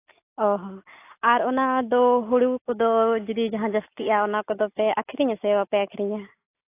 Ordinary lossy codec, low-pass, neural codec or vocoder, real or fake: AAC, 24 kbps; 3.6 kHz; none; real